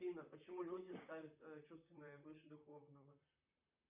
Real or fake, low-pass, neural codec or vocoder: fake; 3.6 kHz; vocoder, 44.1 kHz, 128 mel bands, Pupu-Vocoder